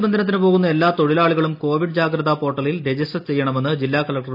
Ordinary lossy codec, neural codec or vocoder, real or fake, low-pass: none; none; real; 5.4 kHz